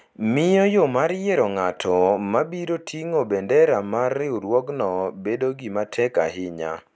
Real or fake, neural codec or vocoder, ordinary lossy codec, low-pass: real; none; none; none